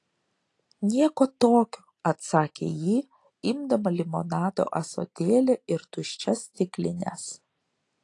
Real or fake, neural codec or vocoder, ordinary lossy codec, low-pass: real; none; AAC, 48 kbps; 10.8 kHz